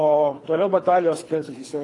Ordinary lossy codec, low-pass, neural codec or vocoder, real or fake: AAC, 32 kbps; 10.8 kHz; codec, 24 kHz, 3 kbps, HILCodec; fake